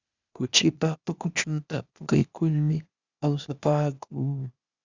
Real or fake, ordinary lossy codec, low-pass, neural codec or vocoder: fake; Opus, 64 kbps; 7.2 kHz; codec, 16 kHz, 0.8 kbps, ZipCodec